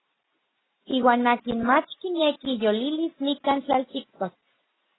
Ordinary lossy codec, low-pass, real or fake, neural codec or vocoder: AAC, 16 kbps; 7.2 kHz; real; none